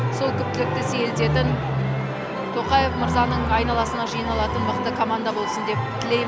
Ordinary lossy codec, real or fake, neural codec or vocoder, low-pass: none; real; none; none